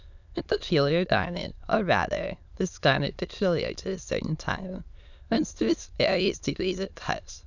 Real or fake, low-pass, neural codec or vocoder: fake; 7.2 kHz; autoencoder, 22.05 kHz, a latent of 192 numbers a frame, VITS, trained on many speakers